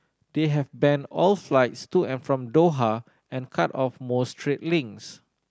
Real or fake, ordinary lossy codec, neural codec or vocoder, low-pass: real; none; none; none